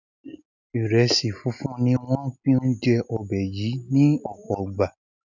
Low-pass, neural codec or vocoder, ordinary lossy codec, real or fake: 7.2 kHz; none; none; real